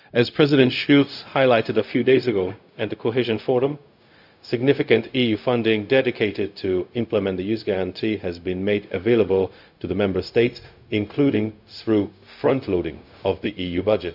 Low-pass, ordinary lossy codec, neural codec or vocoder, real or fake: 5.4 kHz; none; codec, 16 kHz, 0.4 kbps, LongCat-Audio-Codec; fake